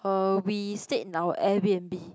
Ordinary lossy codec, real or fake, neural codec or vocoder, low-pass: none; real; none; none